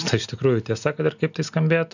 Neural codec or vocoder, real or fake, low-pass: none; real; 7.2 kHz